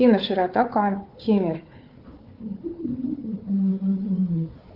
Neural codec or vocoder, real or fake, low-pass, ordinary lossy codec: codec, 16 kHz, 4 kbps, FunCodec, trained on Chinese and English, 50 frames a second; fake; 5.4 kHz; Opus, 32 kbps